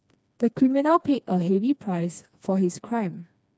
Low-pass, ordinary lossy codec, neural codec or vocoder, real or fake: none; none; codec, 16 kHz, 2 kbps, FreqCodec, smaller model; fake